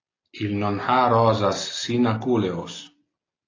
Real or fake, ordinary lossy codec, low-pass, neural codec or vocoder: real; AAC, 32 kbps; 7.2 kHz; none